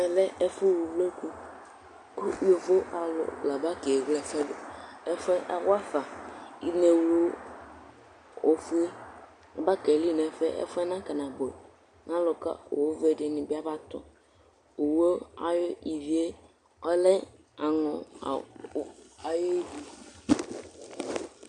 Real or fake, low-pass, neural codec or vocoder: real; 10.8 kHz; none